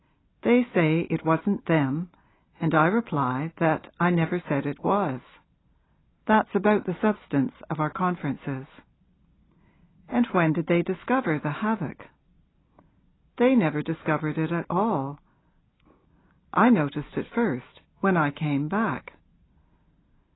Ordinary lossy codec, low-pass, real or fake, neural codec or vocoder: AAC, 16 kbps; 7.2 kHz; real; none